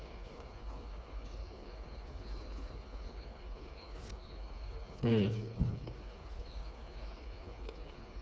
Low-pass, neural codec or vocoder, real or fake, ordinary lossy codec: none; codec, 16 kHz, 4 kbps, FreqCodec, smaller model; fake; none